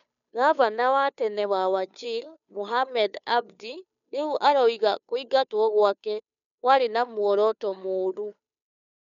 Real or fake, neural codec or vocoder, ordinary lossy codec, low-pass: fake; codec, 16 kHz, 2 kbps, FunCodec, trained on Chinese and English, 25 frames a second; none; 7.2 kHz